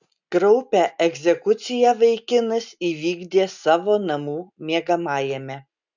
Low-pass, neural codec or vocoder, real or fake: 7.2 kHz; none; real